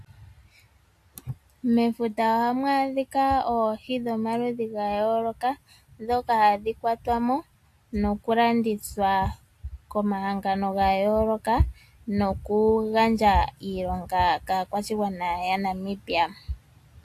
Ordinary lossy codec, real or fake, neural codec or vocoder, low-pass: AAC, 64 kbps; real; none; 14.4 kHz